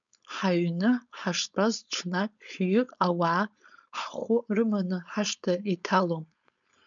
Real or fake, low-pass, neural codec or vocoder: fake; 7.2 kHz; codec, 16 kHz, 4.8 kbps, FACodec